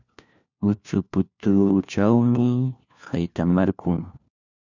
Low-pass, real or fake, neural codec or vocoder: 7.2 kHz; fake; codec, 16 kHz, 1 kbps, FunCodec, trained on LibriTTS, 50 frames a second